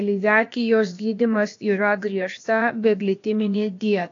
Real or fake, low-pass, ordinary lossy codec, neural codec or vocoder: fake; 7.2 kHz; AAC, 48 kbps; codec, 16 kHz, about 1 kbps, DyCAST, with the encoder's durations